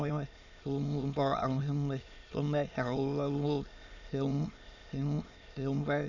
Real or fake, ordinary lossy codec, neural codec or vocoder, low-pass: fake; none; autoencoder, 22.05 kHz, a latent of 192 numbers a frame, VITS, trained on many speakers; 7.2 kHz